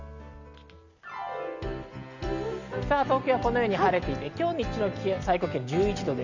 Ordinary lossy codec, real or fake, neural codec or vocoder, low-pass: Opus, 64 kbps; real; none; 7.2 kHz